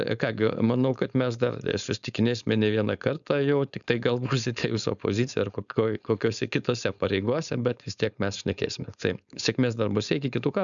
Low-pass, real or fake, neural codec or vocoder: 7.2 kHz; fake; codec, 16 kHz, 4.8 kbps, FACodec